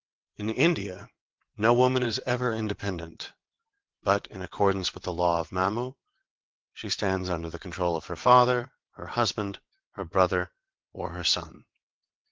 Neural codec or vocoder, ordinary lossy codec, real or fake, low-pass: vocoder, 22.05 kHz, 80 mel bands, WaveNeXt; Opus, 16 kbps; fake; 7.2 kHz